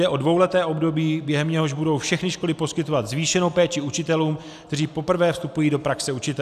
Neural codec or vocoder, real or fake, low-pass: none; real; 14.4 kHz